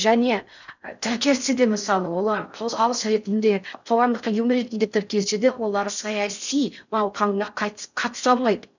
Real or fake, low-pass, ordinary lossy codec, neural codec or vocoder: fake; 7.2 kHz; none; codec, 16 kHz in and 24 kHz out, 0.6 kbps, FocalCodec, streaming, 4096 codes